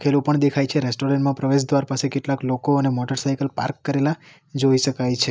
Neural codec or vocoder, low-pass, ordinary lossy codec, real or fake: none; none; none; real